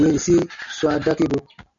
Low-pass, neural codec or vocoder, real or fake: 7.2 kHz; none; real